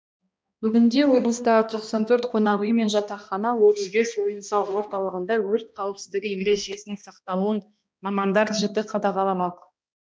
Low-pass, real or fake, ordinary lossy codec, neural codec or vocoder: none; fake; none; codec, 16 kHz, 1 kbps, X-Codec, HuBERT features, trained on balanced general audio